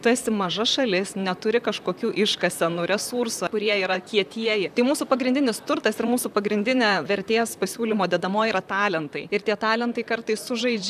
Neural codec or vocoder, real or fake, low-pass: vocoder, 44.1 kHz, 128 mel bands, Pupu-Vocoder; fake; 14.4 kHz